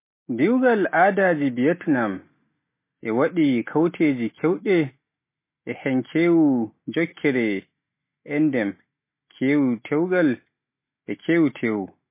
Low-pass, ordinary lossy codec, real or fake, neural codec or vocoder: 3.6 kHz; MP3, 24 kbps; real; none